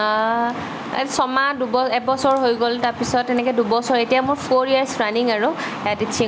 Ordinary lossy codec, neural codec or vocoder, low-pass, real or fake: none; none; none; real